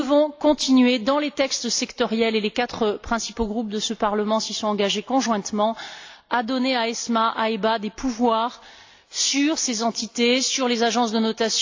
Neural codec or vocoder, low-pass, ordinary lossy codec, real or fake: none; 7.2 kHz; AAC, 48 kbps; real